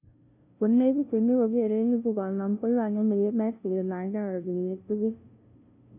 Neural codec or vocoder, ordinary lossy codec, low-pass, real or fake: codec, 16 kHz, 0.5 kbps, FunCodec, trained on LibriTTS, 25 frames a second; none; 3.6 kHz; fake